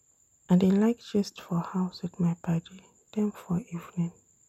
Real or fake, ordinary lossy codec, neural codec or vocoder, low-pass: real; MP3, 64 kbps; none; 19.8 kHz